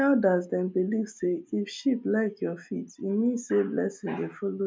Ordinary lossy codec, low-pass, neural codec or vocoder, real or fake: none; none; none; real